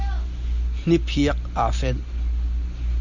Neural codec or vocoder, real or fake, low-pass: none; real; 7.2 kHz